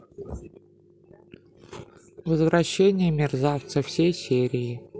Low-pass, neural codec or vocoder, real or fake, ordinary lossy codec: none; none; real; none